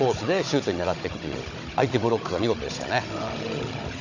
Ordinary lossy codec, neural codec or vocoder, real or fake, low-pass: Opus, 64 kbps; codec, 16 kHz, 16 kbps, FunCodec, trained on Chinese and English, 50 frames a second; fake; 7.2 kHz